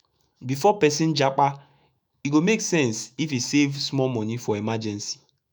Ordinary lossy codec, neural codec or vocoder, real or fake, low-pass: none; autoencoder, 48 kHz, 128 numbers a frame, DAC-VAE, trained on Japanese speech; fake; none